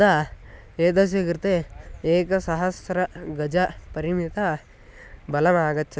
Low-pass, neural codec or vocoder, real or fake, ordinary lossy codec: none; none; real; none